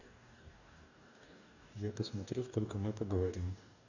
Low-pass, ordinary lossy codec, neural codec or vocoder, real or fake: 7.2 kHz; none; codec, 44.1 kHz, 2.6 kbps, DAC; fake